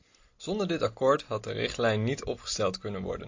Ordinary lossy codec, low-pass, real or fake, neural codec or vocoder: AAC, 48 kbps; 7.2 kHz; real; none